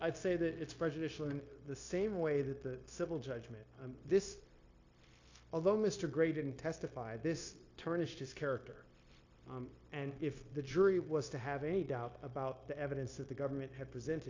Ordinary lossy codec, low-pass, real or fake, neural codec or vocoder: AAC, 48 kbps; 7.2 kHz; fake; codec, 16 kHz, 0.9 kbps, LongCat-Audio-Codec